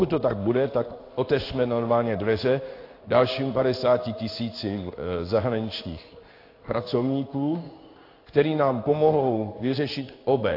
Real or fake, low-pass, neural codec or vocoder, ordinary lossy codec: fake; 5.4 kHz; codec, 16 kHz in and 24 kHz out, 1 kbps, XY-Tokenizer; AAC, 32 kbps